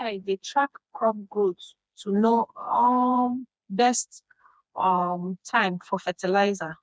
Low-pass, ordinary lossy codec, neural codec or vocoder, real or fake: none; none; codec, 16 kHz, 2 kbps, FreqCodec, smaller model; fake